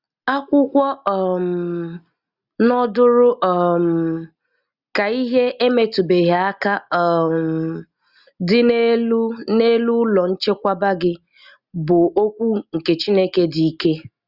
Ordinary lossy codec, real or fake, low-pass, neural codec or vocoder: Opus, 64 kbps; real; 5.4 kHz; none